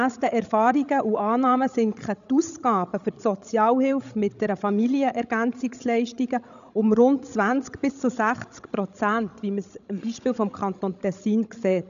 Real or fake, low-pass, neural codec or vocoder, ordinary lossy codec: fake; 7.2 kHz; codec, 16 kHz, 16 kbps, FreqCodec, larger model; none